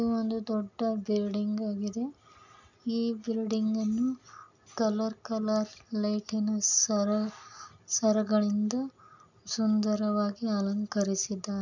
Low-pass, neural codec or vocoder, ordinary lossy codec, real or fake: 7.2 kHz; none; none; real